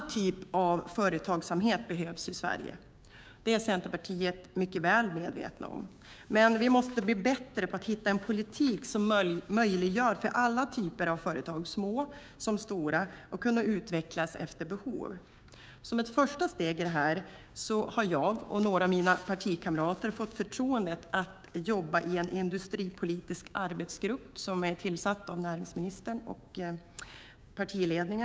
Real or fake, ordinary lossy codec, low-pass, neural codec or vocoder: fake; none; none; codec, 16 kHz, 6 kbps, DAC